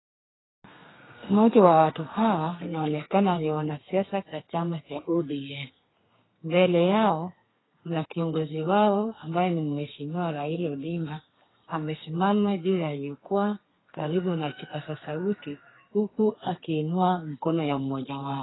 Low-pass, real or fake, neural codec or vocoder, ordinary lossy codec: 7.2 kHz; fake; codec, 32 kHz, 1.9 kbps, SNAC; AAC, 16 kbps